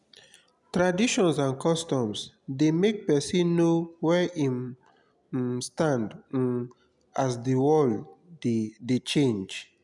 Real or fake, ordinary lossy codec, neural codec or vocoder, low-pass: real; none; none; 10.8 kHz